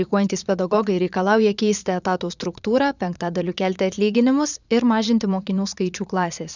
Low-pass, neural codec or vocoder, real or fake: 7.2 kHz; vocoder, 22.05 kHz, 80 mel bands, Vocos; fake